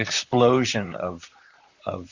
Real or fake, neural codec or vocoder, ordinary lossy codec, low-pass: fake; vocoder, 44.1 kHz, 128 mel bands, Pupu-Vocoder; Opus, 64 kbps; 7.2 kHz